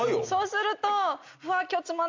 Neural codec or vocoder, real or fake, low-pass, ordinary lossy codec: none; real; 7.2 kHz; MP3, 64 kbps